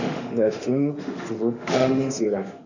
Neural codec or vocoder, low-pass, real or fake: autoencoder, 48 kHz, 32 numbers a frame, DAC-VAE, trained on Japanese speech; 7.2 kHz; fake